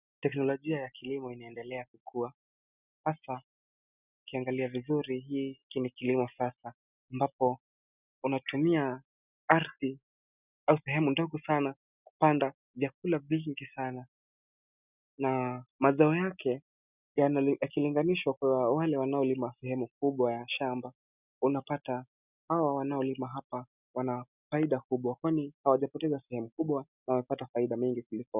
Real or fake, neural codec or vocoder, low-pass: real; none; 3.6 kHz